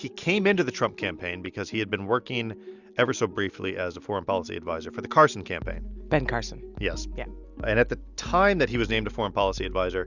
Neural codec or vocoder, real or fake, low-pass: none; real; 7.2 kHz